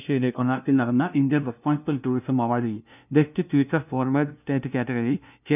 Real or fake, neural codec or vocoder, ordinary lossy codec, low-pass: fake; codec, 16 kHz, 0.5 kbps, FunCodec, trained on LibriTTS, 25 frames a second; none; 3.6 kHz